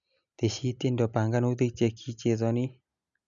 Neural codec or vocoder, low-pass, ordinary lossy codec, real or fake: none; 7.2 kHz; none; real